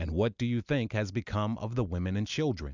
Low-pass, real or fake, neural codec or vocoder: 7.2 kHz; real; none